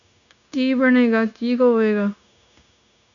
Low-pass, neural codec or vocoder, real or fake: 7.2 kHz; codec, 16 kHz, 0.9 kbps, LongCat-Audio-Codec; fake